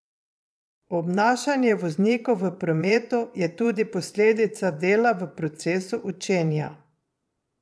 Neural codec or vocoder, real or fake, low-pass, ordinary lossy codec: vocoder, 22.05 kHz, 80 mel bands, Vocos; fake; none; none